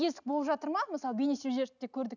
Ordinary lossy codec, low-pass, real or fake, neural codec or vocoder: none; 7.2 kHz; real; none